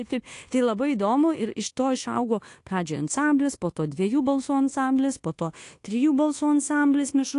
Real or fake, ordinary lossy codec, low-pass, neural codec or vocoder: fake; AAC, 48 kbps; 10.8 kHz; codec, 24 kHz, 1.2 kbps, DualCodec